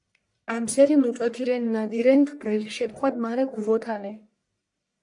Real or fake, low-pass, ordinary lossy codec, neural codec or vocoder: fake; 10.8 kHz; AAC, 64 kbps; codec, 44.1 kHz, 1.7 kbps, Pupu-Codec